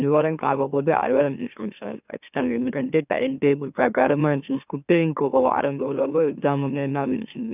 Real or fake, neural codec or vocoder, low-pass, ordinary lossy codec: fake; autoencoder, 44.1 kHz, a latent of 192 numbers a frame, MeloTTS; 3.6 kHz; none